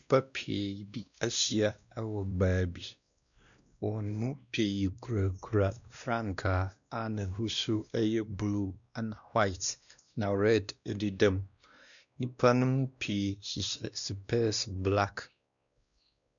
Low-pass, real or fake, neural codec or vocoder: 7.2 kHz; fake; codec, 16 kHz, 1 kbps, X-Codec, WavLM features, trained on Multilingual LibriSpeech